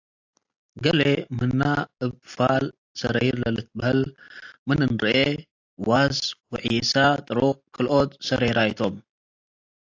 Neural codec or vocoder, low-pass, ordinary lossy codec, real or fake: none; 7.2 kHz; AAC, 48 kbps; real